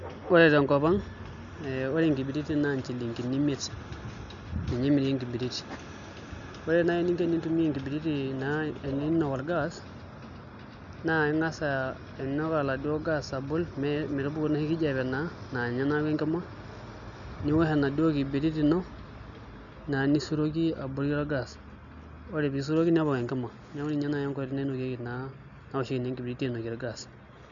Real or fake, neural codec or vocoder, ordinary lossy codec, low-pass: real; none; none; 7.2 kHz